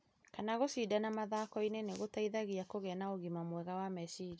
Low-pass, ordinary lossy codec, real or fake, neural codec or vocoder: none; none; real; none